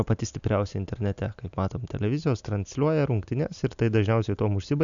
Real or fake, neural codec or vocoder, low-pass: real; none; 7.2 kHz